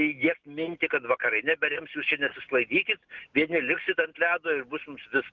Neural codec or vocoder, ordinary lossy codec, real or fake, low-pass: none; Opus, 16 kbps; real; 7.2 kHz